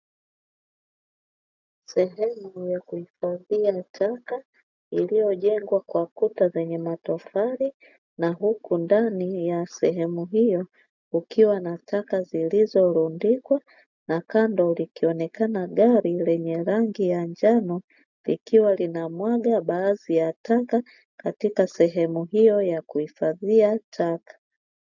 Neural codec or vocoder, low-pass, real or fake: none; 7.2 kHz; real